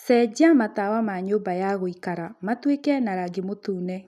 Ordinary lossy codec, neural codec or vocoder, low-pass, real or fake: none; none; 14.4 kHz; real